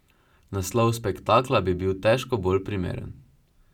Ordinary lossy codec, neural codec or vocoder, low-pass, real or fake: none; none; 19.8 kHz; real